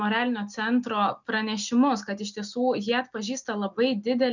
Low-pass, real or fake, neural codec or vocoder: 7.2 kHz; real; none